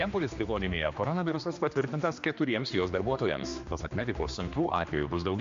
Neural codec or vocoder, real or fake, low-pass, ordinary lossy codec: codec, 16 kHz, 2 kbps, X-Codec, HuBERT features, trained on general audio; fake; 7.2 kHz; MP3, 48 kbps